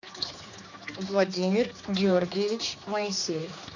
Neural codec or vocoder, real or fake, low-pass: codec, 16 kHz, 2 kbps, X-Codec, HuBERT features, trained on general audio; fake; 7.2 kHz